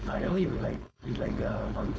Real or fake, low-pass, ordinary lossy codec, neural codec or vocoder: fake; none; none; codec, 16 kHz, 4.8 kbps, FACodec